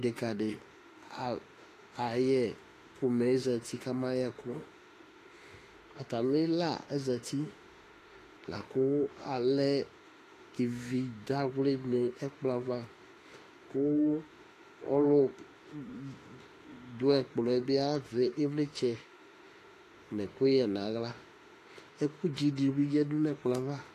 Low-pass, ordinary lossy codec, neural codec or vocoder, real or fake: 14.4 kHz; AAC, 48 kbps; autoencoder, 48 kHz, 32 numbers a frame, DAC-VAE, trained on Japanese speech; fake